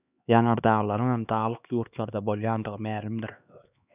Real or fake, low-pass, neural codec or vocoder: fake; 3.6 kHz; codec, 16 kHz, 2 kbps, X-Codec, HuBERT features, trained on LibriSpeech